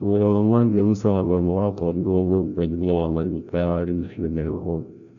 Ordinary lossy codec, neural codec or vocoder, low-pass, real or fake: none; codec, 16 kHz, 0.5 kbps, FreqCodec, larger model; 7.2 kHz; fake